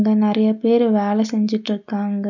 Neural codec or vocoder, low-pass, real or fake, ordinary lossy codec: none; 7.2 kHz; real; none